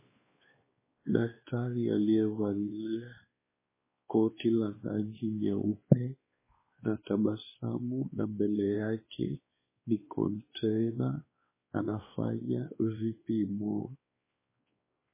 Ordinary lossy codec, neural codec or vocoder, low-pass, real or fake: MP3, 16 kbps; codec, 16 kHz, 2 kbps, X-Codec, WavLM features, trained on Multilingual LibriSpeech; 3.6 kHz; fake